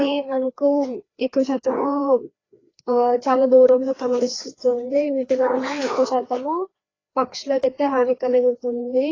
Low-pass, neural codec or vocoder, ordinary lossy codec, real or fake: 7.2 kHz; codec, 16 kHz, 2 kbps, FreqCodec, larger model; AAC, 32 kbps; fake